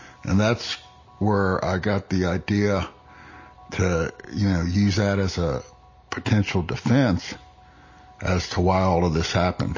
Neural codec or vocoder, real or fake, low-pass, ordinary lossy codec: none; real; 7.2 kHz; MP3, 32 kbps